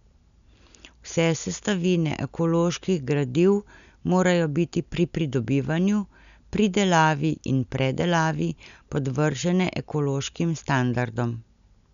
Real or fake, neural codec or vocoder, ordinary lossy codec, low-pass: real; none; none; 7.2 kHz